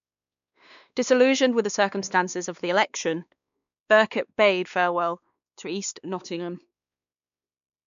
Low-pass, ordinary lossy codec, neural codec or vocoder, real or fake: 7.2 kHz; none; codec, 16 kHz, 2 kbps, X-Codec, WavLM features, trained on Multilingual LibriSpeech; fake